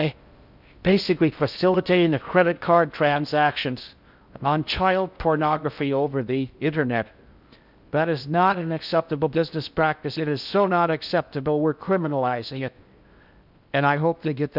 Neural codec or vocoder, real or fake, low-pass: codec, 16 kHz in and 24 kHz out, 0.6 kbps, FocalCodec, streaming, 4096 codes; fake; 5.4 kHz